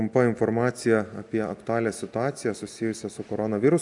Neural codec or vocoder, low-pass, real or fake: none; 10.8 kHz; real